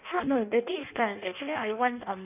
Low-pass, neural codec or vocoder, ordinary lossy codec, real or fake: 3.6 kHz; codec, 16 kHz in and 24 kHz out, 0.6 kbps, FireRedTTS-2 codec; none; fake